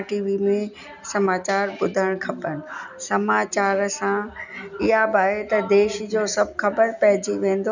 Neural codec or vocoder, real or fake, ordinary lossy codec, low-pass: none; real; none; 7.2 kHz